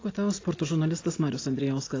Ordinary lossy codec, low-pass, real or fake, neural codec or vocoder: AAC, 32 kbps; 7.2 kHz; real; none